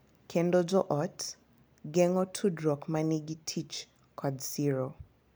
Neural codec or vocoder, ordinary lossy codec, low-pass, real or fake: none; none; none; real